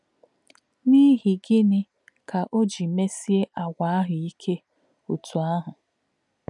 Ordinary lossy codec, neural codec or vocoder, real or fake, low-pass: none; none; real; 10.8 kHz